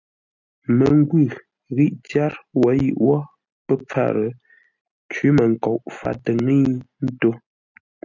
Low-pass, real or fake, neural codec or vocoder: 7.2 kHz; real; none